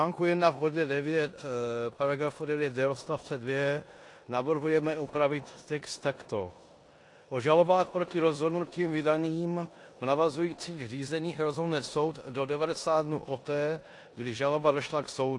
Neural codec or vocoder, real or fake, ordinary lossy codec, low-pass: codec, 16 kHz in and 24 kHz out, 0.9 kbps, LongCat-Audio-Codec, four codebook decoder; fake; AAC, 48 kbps; 10.8 kHz